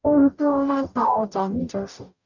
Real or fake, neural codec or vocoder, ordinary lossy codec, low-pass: fake; codec, 44.1 kHz, 0.9 kbps, DAC; none; 7.2 kHz